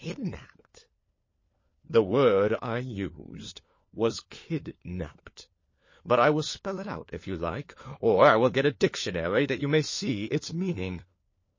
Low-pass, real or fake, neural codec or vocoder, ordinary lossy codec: 7.2 kHz; fake; codec, 16 kHz, 4 kbps, FunCodec, trained on LibriTTS, 50 frames a second; MP3, 32 kbps